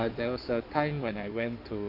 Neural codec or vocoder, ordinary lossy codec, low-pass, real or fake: codec, 16 kHz in and 24 kHz out, 2.2 kbps, FireRedTTS-2 codec; none; 5.4 kHz; fake